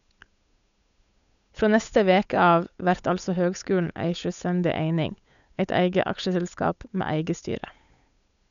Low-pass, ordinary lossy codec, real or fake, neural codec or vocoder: 7.2 kHz; none; fake; codec, 16 kHz, 8 kbps, FunCodec, trained on Chinese and English, 25 frames a second